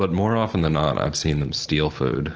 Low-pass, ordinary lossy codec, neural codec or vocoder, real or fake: 7.2 kHz; Opus, 16 kbps; none; real